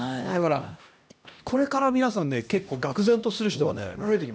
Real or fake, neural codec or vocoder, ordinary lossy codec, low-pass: fake; codec, 16 kHz, 1 kbps, X-Codec, HuBERT features, trained on LibriSpeech; none; none